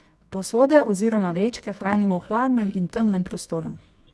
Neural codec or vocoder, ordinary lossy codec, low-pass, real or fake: codec, 24 kHz, 0.9 kbps, WavTokenizer, medium music audio release; none; none; fake